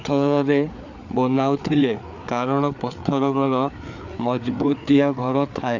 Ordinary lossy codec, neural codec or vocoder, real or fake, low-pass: none; codec, 16 kHz, 4 kbps, FreqCodec, larger model; fake; 7.2 kHz